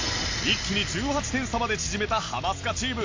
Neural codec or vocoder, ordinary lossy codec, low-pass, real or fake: none; AAC, 48 kbps; 7.2 kHz; real